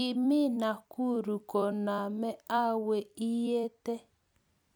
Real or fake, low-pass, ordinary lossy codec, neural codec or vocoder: fake; none; none; vocoder, 44.1 kHz, 128 mel bands every 256 samples, BigVGAN v2